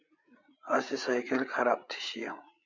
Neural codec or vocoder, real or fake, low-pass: codec, 16 kHz, 8 kbps, FreqCodec, larger model; fake; 7.2 kHz